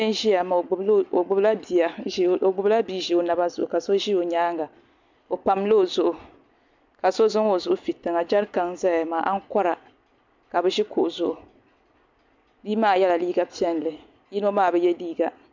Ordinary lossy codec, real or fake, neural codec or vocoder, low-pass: MP3, 64 kbps; real; none; 7.2 kHz